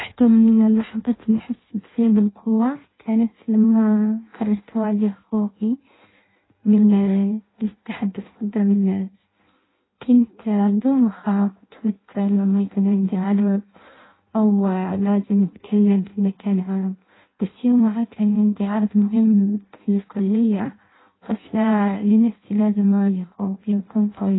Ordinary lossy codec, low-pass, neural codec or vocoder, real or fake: AAC, 16 kbps; 7.2 kHz; codec, 16 kHz in and 24 kHz out, 0.6 kbps, FireRedTTS-2 codec; fake